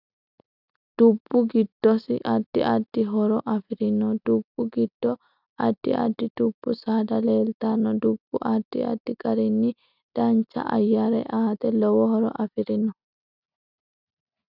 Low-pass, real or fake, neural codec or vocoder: 5.4 kHz; real; none